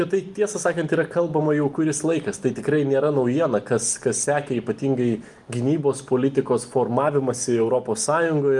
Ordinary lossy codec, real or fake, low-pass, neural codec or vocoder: Opus, 32 kbps; real; 10.8 kHz; none